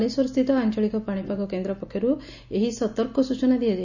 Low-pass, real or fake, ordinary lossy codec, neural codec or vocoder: 7.2 kHz; real; none; none